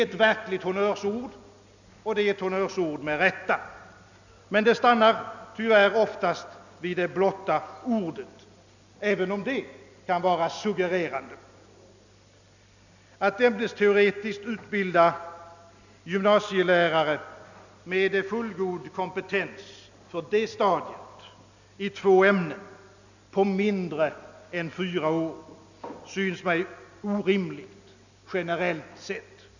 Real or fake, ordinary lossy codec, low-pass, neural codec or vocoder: real; none; 7.2 kHz; none